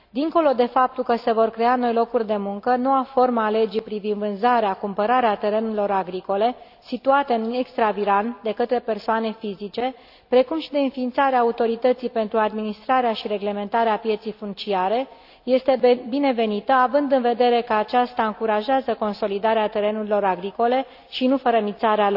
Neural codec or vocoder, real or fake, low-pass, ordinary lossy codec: none; real; 5.4 kHz; AAC, 48 kbps